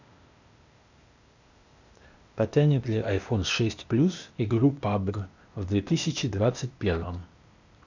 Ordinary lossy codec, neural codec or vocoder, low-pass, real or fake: none; codec, 16 kHz, 0.8 kbps, ZipCodec; 7.2 kHz; fake